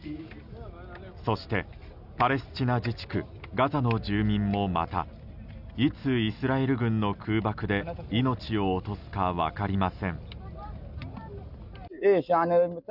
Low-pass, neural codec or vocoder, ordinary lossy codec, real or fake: 5.4 kHz; none; none; real